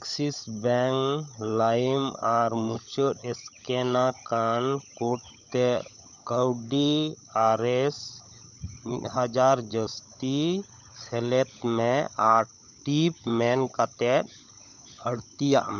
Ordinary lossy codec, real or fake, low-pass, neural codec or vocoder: none; fake; 7.2 kHz; codec, 16 kHz, 16 kbps, FunCodec, trained on LibriTTS, 50 frames a second